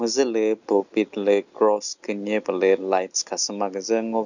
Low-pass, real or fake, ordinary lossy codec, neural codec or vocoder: 7.2 kHz; real; none; none